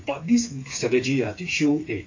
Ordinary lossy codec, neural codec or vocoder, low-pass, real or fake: AAC, 48 kbps; codec, 16 kHz, 4 kbps, FreqCodec, smaller model; 7.2 kHz; fake